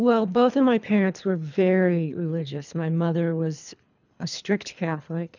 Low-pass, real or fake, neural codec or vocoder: 7.2 kHz; fake; codec, 24 kHz, 3 kbps, HILCodec